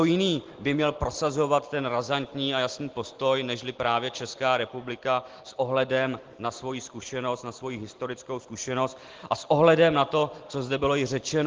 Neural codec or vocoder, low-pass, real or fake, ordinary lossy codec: none; 7.2 kHz; real; Opus, 16 kbps